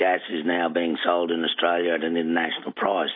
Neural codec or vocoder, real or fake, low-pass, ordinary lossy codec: none; real; 5.4 kHz; MP3, 24 kbps